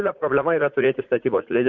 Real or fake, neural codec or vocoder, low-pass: fake; vocoder, 44.1 kHz, 80 mel bands, Vocos; 7.2 kHz